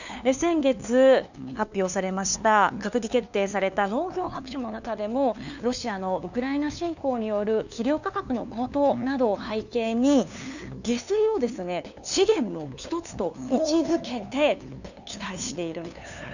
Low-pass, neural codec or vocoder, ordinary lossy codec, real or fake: 7.2 kHz; codec, 16 kHz, 2 kbps, FunCodec, trained on LibriTTS, 25 frames a second; none; fake